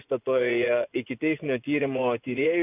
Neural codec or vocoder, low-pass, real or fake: vocoder, 24 kHz, 100 mel bands, Vocos; 3.6 kHz; fake